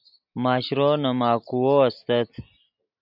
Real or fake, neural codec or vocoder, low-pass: real; none; 5.4 kHz